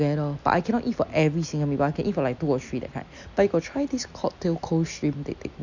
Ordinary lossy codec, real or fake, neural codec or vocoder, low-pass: none; real; none; 7.2 kHz